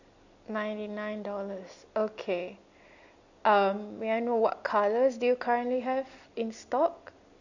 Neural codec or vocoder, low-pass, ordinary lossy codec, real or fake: none; 7.2 kHz; none; real